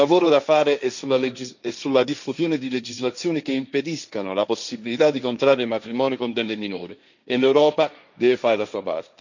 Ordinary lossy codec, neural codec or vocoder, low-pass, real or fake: none; codec, 16 kHz, 1.1 kbps, Voila-Tokenizer; 7.2 kHz; fake